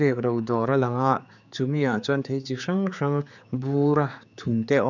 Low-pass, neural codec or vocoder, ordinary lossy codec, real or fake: 7.2 kHz; codec, 16 kHz, 4 kbps, X-Codec, HuBERT features, trained on general audio; none; fake